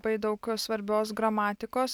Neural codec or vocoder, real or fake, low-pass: none; real; 19.8 kHz